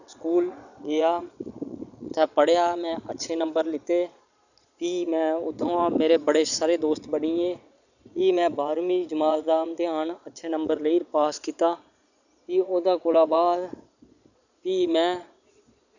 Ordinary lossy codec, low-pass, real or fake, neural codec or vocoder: none; 7.2 kHz; fake; vocoder, 22.05 kHz, 80 mel bands, Vocos